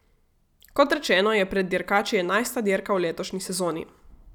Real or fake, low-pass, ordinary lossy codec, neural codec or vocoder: real; 19.8 kHz; none; none